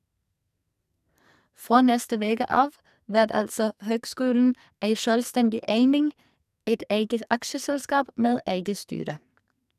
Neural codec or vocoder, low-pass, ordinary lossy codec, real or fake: codec, 44.1 kHz, 2.6 kbps, SNAC; 14.4 kHz; none; fake